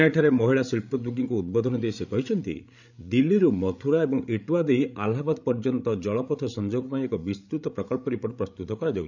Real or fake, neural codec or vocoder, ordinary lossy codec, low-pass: fake; codec, 16 kHz, 16 kbps, FreqCodec, larger model; none; 7.2 kHz